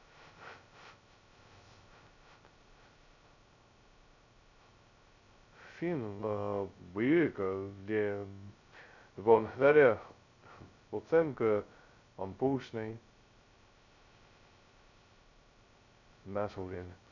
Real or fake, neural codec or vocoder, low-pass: fake; codec, 16 kHz, 0.2 kbps, FocalCodec; 7.2 kHz